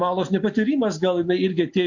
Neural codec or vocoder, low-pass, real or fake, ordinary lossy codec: none; 7.2 kHz; real; MP3, 48 kbps